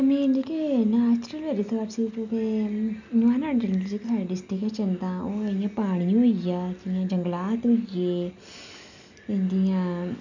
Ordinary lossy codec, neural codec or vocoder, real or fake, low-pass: none; none; real; 7.2 kHz